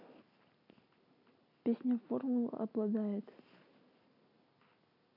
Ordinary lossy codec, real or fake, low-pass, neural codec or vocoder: none; real; 5.4 kHz; none